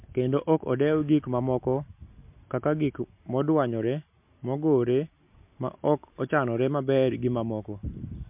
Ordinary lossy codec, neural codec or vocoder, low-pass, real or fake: MP3, 32 kbps; none; 3.6 kHz; real